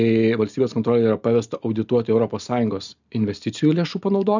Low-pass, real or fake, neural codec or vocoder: 7.2 kHz; real; none